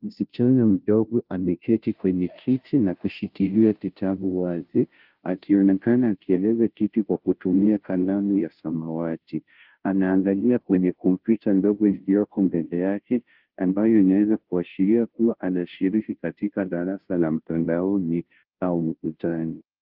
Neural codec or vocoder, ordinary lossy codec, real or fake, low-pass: codec, 16 kHz, 0.5 kbps, FunCodec, trained on Chinese and English, 25 frames a second; Opus, 24 kbps; fake; 5.4 kHz